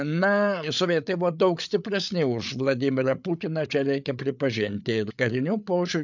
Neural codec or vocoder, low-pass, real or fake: codec, 16 kHz, 8 kbps, FreqCodec, larger model; 7.2 kHz; fake